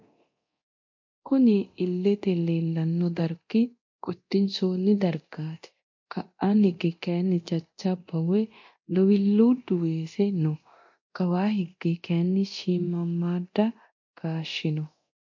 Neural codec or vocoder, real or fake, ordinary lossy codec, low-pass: codec, 24 kHz, 0.9 kbps, DualCodec; fake; MP3, 48 kbps; 7.2 kHz